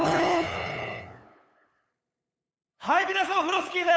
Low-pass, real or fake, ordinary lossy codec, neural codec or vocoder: none; fake; none; codec, 16 kHz, 8 kbps, FunCodec, trained on LibriTTS, 25 frames a second